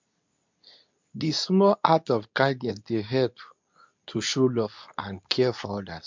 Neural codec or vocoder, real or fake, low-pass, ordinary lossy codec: codec, 24 kHz, 0.9 kbps, WavTokenizer, medium speech release version 2; fake; 7.2 kHz; MP3, 64 kbps